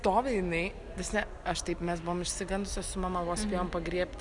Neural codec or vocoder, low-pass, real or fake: none; 10.8 kHz; real